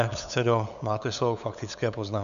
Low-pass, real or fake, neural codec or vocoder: 7.2 kHz; fake; codec, 16 kHz, 8 kbps, FunCodec, trained on LibriTTS, 25 frames a second